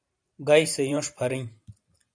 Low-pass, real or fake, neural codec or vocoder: 9.9 kHz; fake; vocoder, 44.1 kHz, 128 mel bands every 512 samples, BigVGAN v2